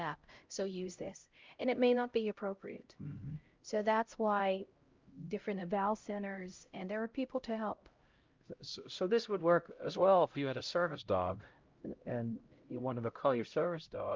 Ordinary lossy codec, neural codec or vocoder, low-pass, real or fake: Opus, 24 kbps; codec, 16 kHz, 0.5 kbps, X-Codec, HuBERT features, trained on LibriSpeech; 7.2 kHz; fake